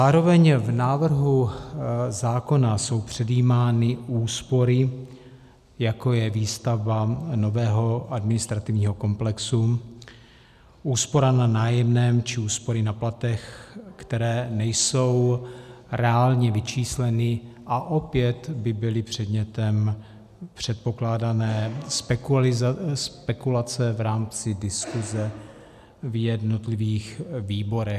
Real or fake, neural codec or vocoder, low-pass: real; none; 14.4 kHz